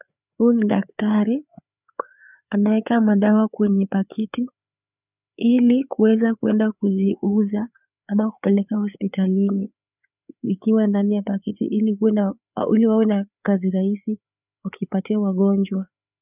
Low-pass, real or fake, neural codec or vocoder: 3.6 kHz; fake; codec, 16 kHz, 4 kbps, FreqCodec, larger model